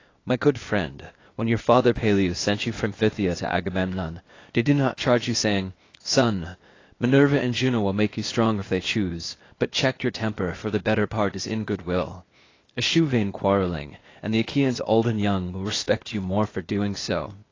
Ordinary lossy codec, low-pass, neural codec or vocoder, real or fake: AAC, 32 kbps; 7.2 kHz; codec, 16 kHz, 0.8 kbps, ZipCodec; fake